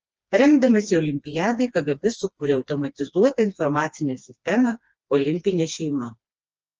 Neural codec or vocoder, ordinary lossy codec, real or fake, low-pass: codec, 16 kHz, 2 kbps, FreqCodec, smaller model; Opus, 24 kbps; fake; 7.2 kHz